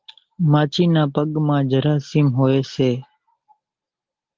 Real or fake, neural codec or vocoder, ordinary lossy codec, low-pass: real; none; Opus, 16 kbps; 7.2 kHz